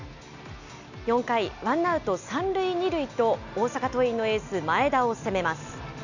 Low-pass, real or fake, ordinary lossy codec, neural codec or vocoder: 7.2 kHz; real; none; none